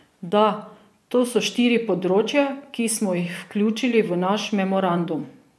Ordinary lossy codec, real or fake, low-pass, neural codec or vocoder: none; fake; none; vocoder, 24 kHz, 100 mel bands, Vocos